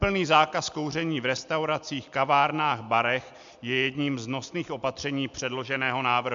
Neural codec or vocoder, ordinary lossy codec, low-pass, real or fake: none; MP3, 64 kbps; 7.2 kHz; real